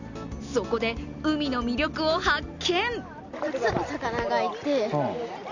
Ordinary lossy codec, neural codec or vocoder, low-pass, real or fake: none; none; 7.2 kHz; real